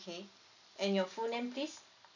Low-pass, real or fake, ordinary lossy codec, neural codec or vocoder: 7.2 kHz; real; none; none